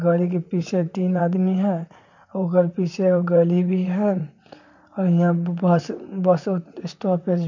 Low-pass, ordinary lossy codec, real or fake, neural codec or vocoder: 7.2 kHz; none; fake; vocoder, 44.1 kHz, 128 mel bands, Pupu-Vocoder